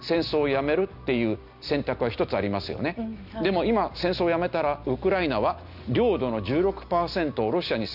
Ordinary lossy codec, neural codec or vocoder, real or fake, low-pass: none; none; real; 5.4 kHz